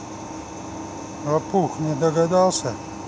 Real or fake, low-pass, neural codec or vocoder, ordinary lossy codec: real; none; none; none